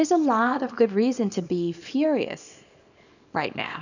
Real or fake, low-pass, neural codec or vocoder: fake; 7.2 kHz; codec, 24 kHz, 0.9 kbps, WavTokenizer, small release